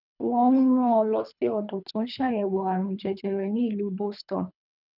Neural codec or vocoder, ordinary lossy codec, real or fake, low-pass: codec, 24 kHz, 3 kbps, HILCodec; none; fake; 5.4 kHz